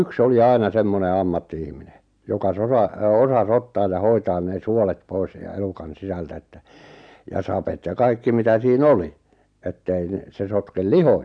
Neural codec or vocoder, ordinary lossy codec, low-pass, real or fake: none; none; 9.9 kHz; real